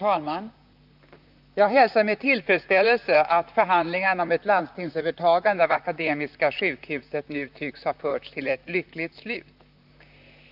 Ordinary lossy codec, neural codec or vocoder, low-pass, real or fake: none; vocoder, 44.1 kHz, 128 mel bands, Pupu-Vocoder; 5.4 kHz; fake